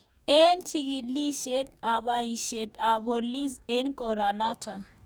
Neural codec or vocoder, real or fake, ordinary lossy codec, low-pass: codec, 44.1 kHz, 2.6 kbps, DAC; fake; none; none